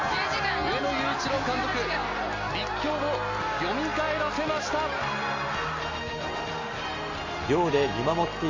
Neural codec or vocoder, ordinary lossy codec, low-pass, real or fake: none; MP3, 48 kbps; 7.2 kHz; real